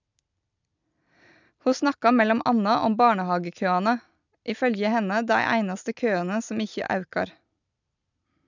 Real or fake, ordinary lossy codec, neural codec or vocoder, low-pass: real; none; none; 7.2 kHz